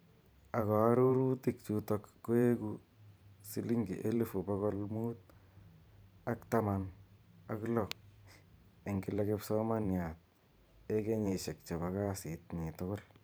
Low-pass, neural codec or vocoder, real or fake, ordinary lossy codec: none; vocoder, 44.1 kHz, 128 mel bands every 512 samples, BigVGAN v2; fake; none